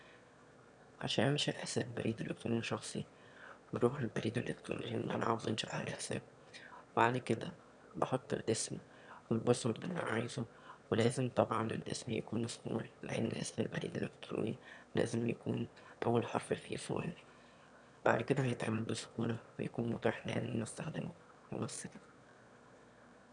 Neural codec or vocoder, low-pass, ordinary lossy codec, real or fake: autoencoder, 22.05 kHz, a latent of 192 numbers a frame, VITS, trained on one speaker; 9.9 kHz; none; fake